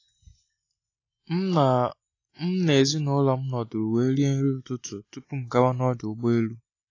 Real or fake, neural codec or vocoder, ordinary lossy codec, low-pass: real; none; AAC, 32 kbps; 7.2 kHz